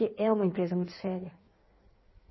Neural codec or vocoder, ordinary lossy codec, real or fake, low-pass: codec, 16 kHz in and 24 kHz out, 1.1 kbps, FireRedTTS-2 codec; MP3, 24 kbps; fake; 7.2 kHz